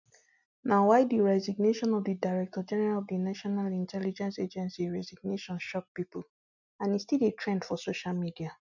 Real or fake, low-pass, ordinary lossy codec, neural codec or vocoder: real; 7.2 kHz; none; none